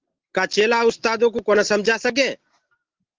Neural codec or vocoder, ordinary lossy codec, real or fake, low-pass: none; Opus, 16 kbps; real; 7.2 kHz